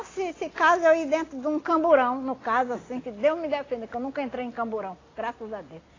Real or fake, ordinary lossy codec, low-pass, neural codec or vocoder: real; AAC, 32 kbps; 7.2 kHz; none